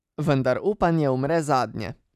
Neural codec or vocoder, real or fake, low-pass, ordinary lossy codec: none; real; 14.4 kHz; none